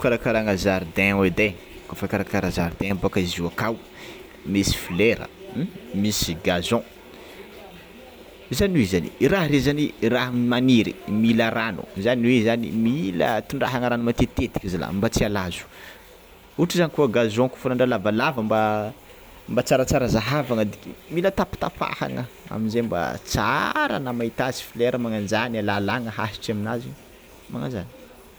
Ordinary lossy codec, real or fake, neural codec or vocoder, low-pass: none; real; none; none